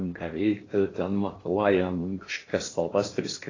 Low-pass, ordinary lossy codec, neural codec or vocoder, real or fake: 7.2 kHz; AAC, 32 kbps; codec, 16 kHz in and 24 kHz out, 0.8 kbps, FocalCodec, streaming, 65536 codes; fake